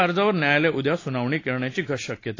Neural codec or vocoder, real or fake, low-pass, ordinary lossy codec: none; real; 7.2 kHz; AAC, 32 kbps